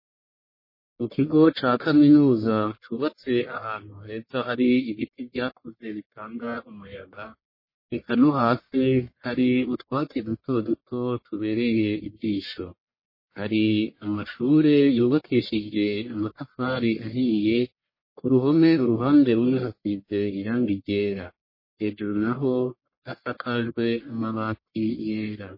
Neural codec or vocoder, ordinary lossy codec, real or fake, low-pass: codec, 44.1 kHz, 1.7 kbps, Pupu-Codec; MP3, 24 kbps; fake; 5.4 kHz